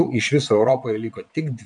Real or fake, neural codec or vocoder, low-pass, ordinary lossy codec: fake; vocoder, 22.05 kHz, 80 mel bands, Vocos; 9.9 kHz; MP3, 64 kbps